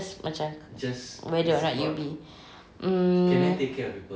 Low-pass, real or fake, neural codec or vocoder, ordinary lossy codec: none; real; none; none